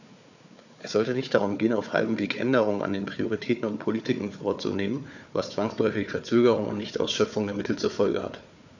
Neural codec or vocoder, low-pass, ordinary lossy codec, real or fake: codec, 16 kHz, 4 kbps, FunCodec, trained on Chinese and English, 50 frames a second; 7.2 kHz; none; fake